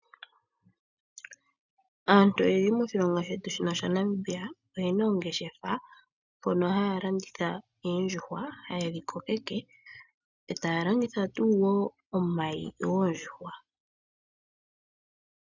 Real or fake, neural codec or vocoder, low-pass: real; none; 7.2 kHz